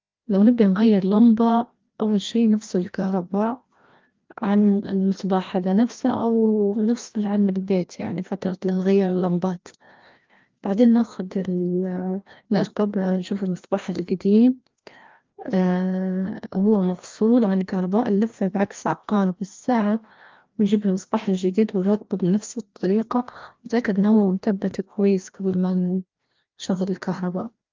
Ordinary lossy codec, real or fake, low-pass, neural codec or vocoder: Opus, 32 kbps; fake; 7.2 kHz; codec, 16 kHz, 1 kbps, FreqCodec, larger model